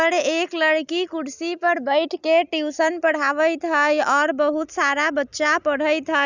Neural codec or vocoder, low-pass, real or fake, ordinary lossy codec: none; 7.2 kHz; real; none